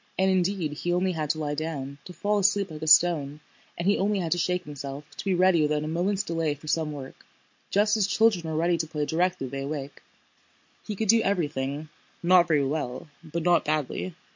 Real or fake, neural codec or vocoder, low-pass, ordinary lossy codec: real; none; 7.2 kHz; MP3, 48 kbps